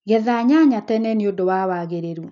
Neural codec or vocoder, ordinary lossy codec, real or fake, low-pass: none; none; real; 7.2 kHz